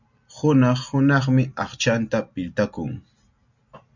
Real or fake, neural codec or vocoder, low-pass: real; none; 7.2 kHz